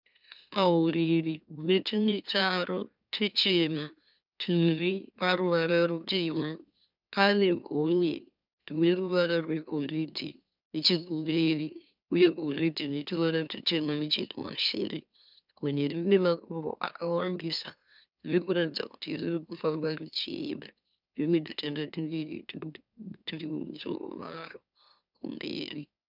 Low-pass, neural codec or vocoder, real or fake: 5.4 kHz; autoencoder, 44.1 kHz, a latent of 192 numbers a frame, MeloTTS; fake